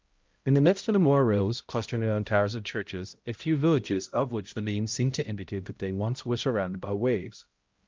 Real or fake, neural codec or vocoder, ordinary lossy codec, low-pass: fake; codec, 16 kHz, 0.5 kbps, X-Codec, HuBERT features, trained on balanced general audio; Opus, 24 kbps; 7.2 kHz